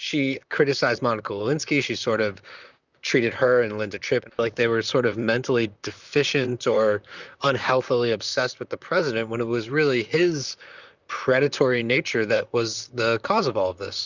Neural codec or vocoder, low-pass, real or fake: vocoder, 44.1 kHz, 128 mel bands, Pupu-Vocoder; 7.2 kHz; fake